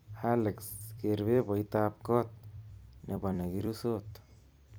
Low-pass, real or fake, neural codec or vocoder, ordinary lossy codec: none; fake; vocoder, 44.1 kHz, 128 mel bands every 256 samples, BigVGAN v2; none